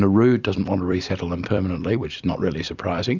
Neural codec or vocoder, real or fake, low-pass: vocoder, 44.1 kHz, 128 mel bands every 256 samples, BigVGAN v2; fake; 7.2 kHz